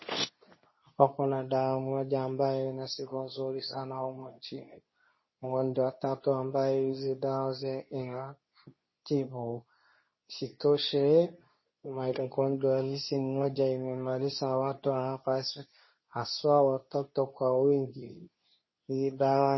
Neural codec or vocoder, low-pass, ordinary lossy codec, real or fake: codec, 24 kHz, 0.9 kbps, WavTokenizer, medium speech release version 2; 7.2 kHz; MP3, 24 kbps; fake